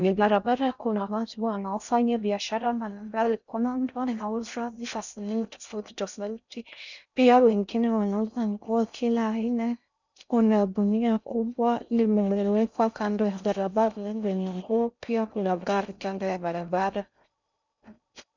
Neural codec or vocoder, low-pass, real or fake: codec, 16 kHz in and 24 kHz out, 0.6 kbps, FocalCodec, streaming, 4096 codes; 7.2 kHz; fake